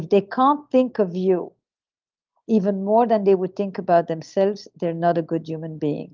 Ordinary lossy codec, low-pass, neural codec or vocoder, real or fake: Opus, 32 kbps; 7.2 kHz; none; real